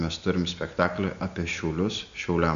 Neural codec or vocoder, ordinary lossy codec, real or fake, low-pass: none; AAC, 96 kbps; real; 7.2 kHz